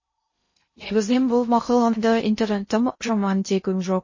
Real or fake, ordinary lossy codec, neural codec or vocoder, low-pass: fake; MP3, 32 kbps; codec, 16 kHz in and 24 kHz out, 0.6 kbps, FocalCodec, streaming, 2048 codes; 7.2 kHz